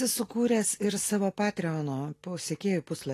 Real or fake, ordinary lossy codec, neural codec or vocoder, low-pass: real; AAC, 48 kbps; none; 14.4 kHz